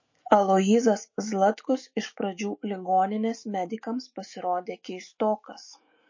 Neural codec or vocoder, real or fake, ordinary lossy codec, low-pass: autoencoder, 48 kHz, 128 numbers a frame, DAC-VAE, trained on Japanese speech; fake; MP3, 32 kbps; 7.2 kHz